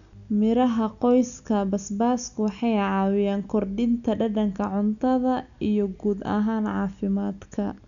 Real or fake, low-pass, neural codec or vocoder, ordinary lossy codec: real; 7.2 kHz; none; none